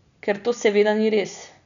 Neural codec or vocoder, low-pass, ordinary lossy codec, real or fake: none; 7.2 kHz; none; real